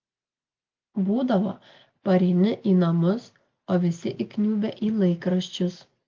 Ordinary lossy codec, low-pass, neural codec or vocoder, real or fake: Opus, 32 kbps; 7.2 kHz; none; real